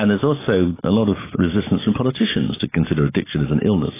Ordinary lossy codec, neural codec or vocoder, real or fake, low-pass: AAC, 16 kbps; none; real; 3.6 kHz